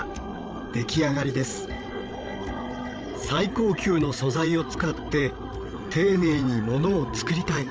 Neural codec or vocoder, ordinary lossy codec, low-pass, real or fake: codec, 16 kHz, 8 kbps, FreqCodec, larger model; none; none; fake